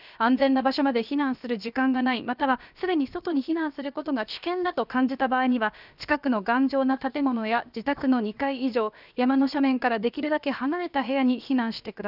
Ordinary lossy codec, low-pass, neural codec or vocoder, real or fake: none; 5.4 kHz; codec, 16 kHz, about 1 kbps, DyCAST, with the encoder's durations; fake